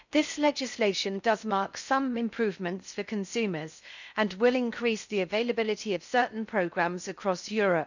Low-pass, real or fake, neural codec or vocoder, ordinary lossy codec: 7.2 kHz; fake; codec, 16 kHz in and 24 kHz out, 0.6 kbps, FocalCodec, streaming, 4096 codes; none